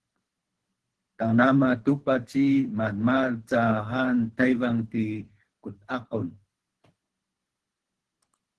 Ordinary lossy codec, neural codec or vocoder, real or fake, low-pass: Opus, 16 kbps; codec, 24 kHz, 3 kbps, HILCodec; fake; 10.8 kHz